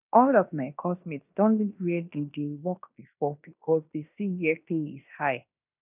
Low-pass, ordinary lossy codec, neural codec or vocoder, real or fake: 3.6 kHz; none; codec, 16 kHz in and 24 kHz out, 0.9 kbps, LongCat-Audio-Codec, fine tuned four codebook decoder; fake